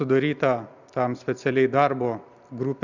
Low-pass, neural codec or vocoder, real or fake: 7.2 kHz; none; real